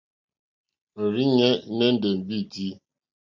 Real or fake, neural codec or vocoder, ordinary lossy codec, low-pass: real; none; MP3, 64 kbps; 7.2 kHz